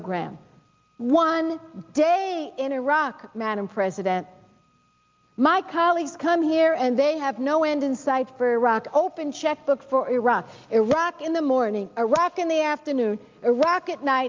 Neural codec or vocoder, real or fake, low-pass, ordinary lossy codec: none; real; 7.2 kHz; Opus, 24 kbps